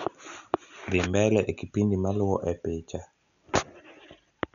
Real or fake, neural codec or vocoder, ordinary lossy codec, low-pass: real; none; none; 7.2 kHz